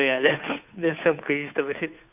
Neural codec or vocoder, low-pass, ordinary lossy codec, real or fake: codec, 16 kHz, 2 kbps, FunCodec, trained on Chinese and English, 25 frames a second; 3.6 kHz; none; fake